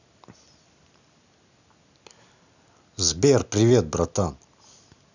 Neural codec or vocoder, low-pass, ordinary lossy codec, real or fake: none; 7.2 kHz; none; real